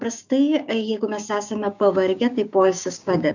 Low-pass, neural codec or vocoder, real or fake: 7.2 kHz; codec, 16 kHz, 6 kbps, DAC; fake